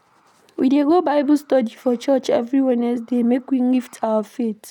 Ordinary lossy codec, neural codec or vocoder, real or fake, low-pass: none; none; real; 19.8 kHz